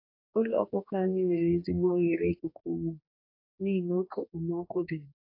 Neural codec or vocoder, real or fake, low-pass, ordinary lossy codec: codec, 44.1 kHz, 2.6 kbps, DAC; fake; 5.4 kHz; AAC, 32 kbps